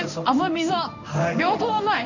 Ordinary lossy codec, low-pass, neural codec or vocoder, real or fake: none; 7.2 kHz; codec, 16 kHz in and 24 kHz out, 1 kbps, XY-Tokenizer; fake